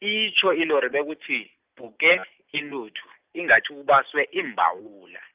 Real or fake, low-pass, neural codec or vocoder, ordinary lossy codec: real; 3.6 kHz; none; Opus, 24 kbps